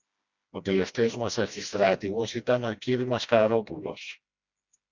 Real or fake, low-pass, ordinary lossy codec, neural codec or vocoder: fake; 7.2 kHz; Opus, 64 kbps; codec, 16 kHz, 1 kbps, FreqCodec, smaller model